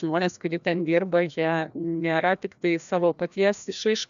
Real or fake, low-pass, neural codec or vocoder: fake; 7.2 kHz; codec, 16 kHz, 1 kbps, FreqCodec, larger model